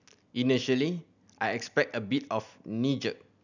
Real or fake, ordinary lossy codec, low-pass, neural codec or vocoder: real; none; 7.2 kHz; none